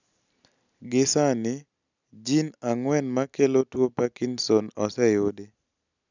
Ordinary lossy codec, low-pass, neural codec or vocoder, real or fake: none; 7.2 kHz; none; real